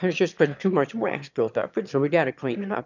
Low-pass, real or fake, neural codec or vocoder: 7.2 kHz; fake; autoencoder, 22.05 kHz, a latent of 192 numbers a frame, VITS, trained on one speaker